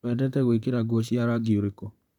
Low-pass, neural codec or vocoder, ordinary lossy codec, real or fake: 19.8 kHz; vocoder, 44.1 kHz, 128 mel bands, Pupu-Vocoder; none; fake